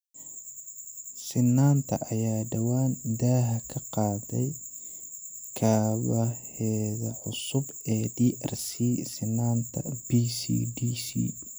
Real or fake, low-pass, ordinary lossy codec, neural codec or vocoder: real; none; none; none